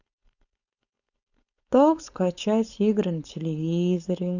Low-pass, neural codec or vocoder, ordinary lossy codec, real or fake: 7.2 kHz; codec, 16 kHz, 4.8 kbps, FACodec; none; fake